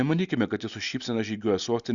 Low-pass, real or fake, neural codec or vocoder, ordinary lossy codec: 7.2 kHz; real; none; Opus, 64 kbps